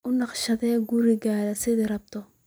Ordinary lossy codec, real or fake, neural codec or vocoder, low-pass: none; fake; vocoder, 44.1 kHz, 128 mel bands every 256 samples, BigVGAN v2; none